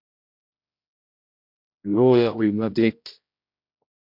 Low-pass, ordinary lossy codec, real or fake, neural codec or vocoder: 5.4 kHz; MP3, 48 kbps; fake; codec, 16 kHz, 0.5 kbps, X-Codec, HuBERT features, trained on general audio